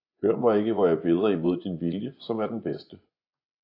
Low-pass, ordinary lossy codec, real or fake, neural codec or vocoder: 5.4 kHz; AAC, 32 kbps; fake; vocoder, 24 kHz, 100 mel bands, Vocos